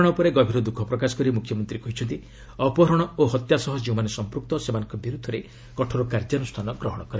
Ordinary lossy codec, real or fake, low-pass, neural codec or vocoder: none; real; none; none